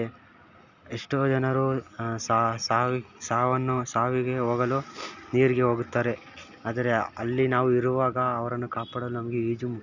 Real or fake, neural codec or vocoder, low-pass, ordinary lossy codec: real; none; 7.2 kHz; none